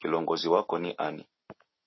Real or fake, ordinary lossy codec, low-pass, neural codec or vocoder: real; MP3, 24 kbps; 7.2 kHz; none